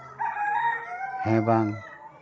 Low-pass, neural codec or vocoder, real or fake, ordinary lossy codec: none; none; real; none